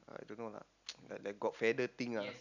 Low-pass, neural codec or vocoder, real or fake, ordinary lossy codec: 7.2 kHz; none; real; none